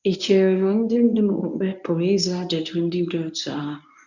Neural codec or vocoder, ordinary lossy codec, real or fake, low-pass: codec, 24 kHz, 0.9 kbps, WavTokenizer, medium speech release version 1; none; fake; 7.2 kHz